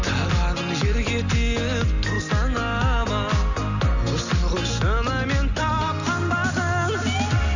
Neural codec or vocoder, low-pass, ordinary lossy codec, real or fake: none; 7.2 kHz; none; real